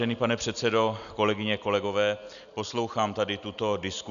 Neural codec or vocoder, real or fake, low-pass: none; real; 7.2 kHz